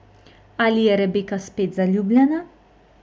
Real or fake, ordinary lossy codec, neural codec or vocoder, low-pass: real; none; none; none